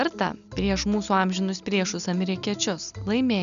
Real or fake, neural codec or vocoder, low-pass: real; none; 7.2 kHz